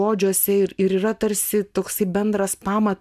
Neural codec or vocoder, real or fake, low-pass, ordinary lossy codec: none; real; 14.4 kHz; MP3, 96 kbps